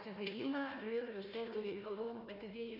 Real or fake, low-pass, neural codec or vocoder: fake; 5.4 kHz; codec, 16 kHz, 1 kbps, FunCodec, trained on Chinese and English, 50 frames a second